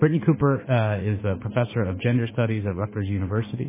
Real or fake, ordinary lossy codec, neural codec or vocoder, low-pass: fake; MP3, 16 kbps; autoencoder, 48 kHz, 32 numbers a frame, DAC-VAE, trained on Japanese speech; 3.6 kHz